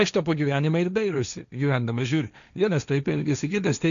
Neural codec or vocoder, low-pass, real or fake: codec, 16 kHz, 1.1 kbps, Voila-Tokenizer; 7.2 kHz; fake